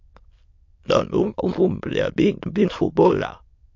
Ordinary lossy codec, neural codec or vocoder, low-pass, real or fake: MP3, 48 kbps; autoencoder, 22.05 kHz, a latent of 192 numbers a frame, VITS, trained on many speakers; 7.2 kHz; fake